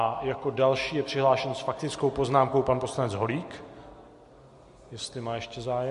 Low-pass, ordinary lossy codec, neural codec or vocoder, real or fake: 14.4 kHz; MP3, 48 kbps; none; real